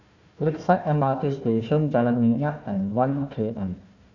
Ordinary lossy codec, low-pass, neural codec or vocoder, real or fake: none; 7.2 kHz; codec, 16 kHz, 1 kbps, FunCodec, trained on Chinese and English, 50 frames a second; fake